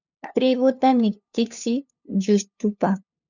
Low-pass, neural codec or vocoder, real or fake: 7.2 kHz; codec, 16 kHz, 2 kbps, FunCodec, trained on LibriTTS, 25 frames a second; fake